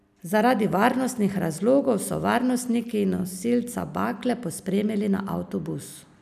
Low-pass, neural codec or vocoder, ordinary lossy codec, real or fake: 14.4 kHz; none; none; real